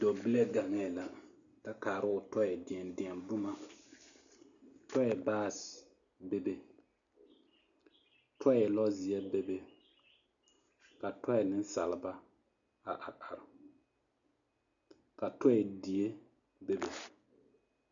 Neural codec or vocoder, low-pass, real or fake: none; 7.2 kHz; real